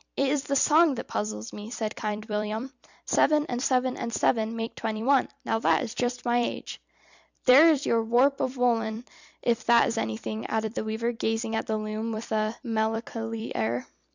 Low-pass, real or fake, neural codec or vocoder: 7.2 kHz; fake; vocoder, 44.1 kHz, 128 mel bands every 256 samples, BigVGAN v2